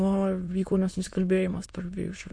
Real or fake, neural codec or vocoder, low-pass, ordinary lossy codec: fake; autoencoder, 22.05 kHz, a latent of 192 numbers a frame, VITS, trained on many speakers; 9.9 kHz; MP3, 48 kbps